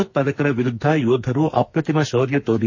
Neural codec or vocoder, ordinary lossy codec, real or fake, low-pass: codec, 44.1 kHz, 2.6 kbps, SNAC; MP3, 32 kbps; fake; 7.2 kHz